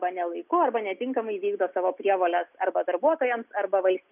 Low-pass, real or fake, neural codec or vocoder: 3.6 kHz; real; none